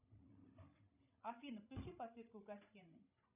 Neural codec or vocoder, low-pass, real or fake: codec, 16 kHz, 16 kbps, FreqCodec, larger model; 3.6 kHz; fake